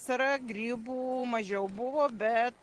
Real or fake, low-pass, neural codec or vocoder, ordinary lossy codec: real; 10.8 kHz; none; Opus, 16 kbps